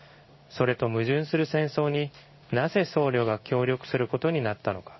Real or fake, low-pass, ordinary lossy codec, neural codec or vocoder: fake; 7.2 kHz; MP3, 24 kbps; codec, 16 kHz in and 24 kHz out, 1 kbps, XY-Tokenizer